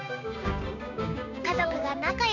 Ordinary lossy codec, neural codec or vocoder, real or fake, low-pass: none; codec, 16 kHz, 6 kbps, DAC; fake; 7.2 kHz